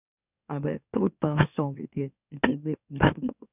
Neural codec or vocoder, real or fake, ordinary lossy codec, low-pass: autoencoder, 44.1 kHz, a latent of 192 numbers a frame, MeloTTS; fake; none; 3.6 kHz